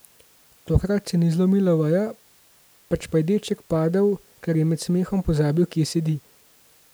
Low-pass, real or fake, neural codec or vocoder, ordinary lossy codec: none; real; none; none